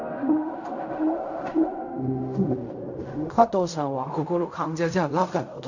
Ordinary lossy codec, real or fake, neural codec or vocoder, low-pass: none; fake; codec, 16 kHz in and 24 kHz out, 0.4 kbps, LongCat-Audio-Codec, fine tuned four codebook decoder; 7.2 kHz